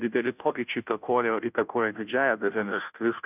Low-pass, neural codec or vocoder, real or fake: 3.6 kHz; codec, 16 kHz, 0.5 kbps, FunCodec, trained on Chinese and English, 25 frames a second; fake